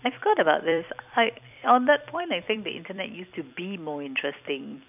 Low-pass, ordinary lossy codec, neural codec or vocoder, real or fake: 3.6 kHz; none; vocoder, 44.1 kHz, 128 mel bands every 256 samples, BigVGAN v2; fake